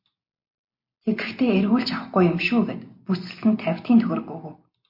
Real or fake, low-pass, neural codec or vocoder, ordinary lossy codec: real; 5.4 kHz; none; MP3, 32 kbps